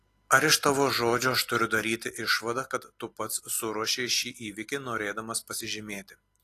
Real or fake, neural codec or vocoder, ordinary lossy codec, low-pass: real; none; AAC, 48 kbps; 14.4 kHz